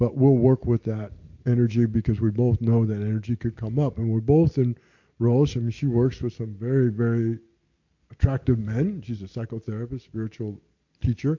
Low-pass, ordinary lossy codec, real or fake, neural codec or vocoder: 7.2 kHz; MP3, 48 kbps; real; none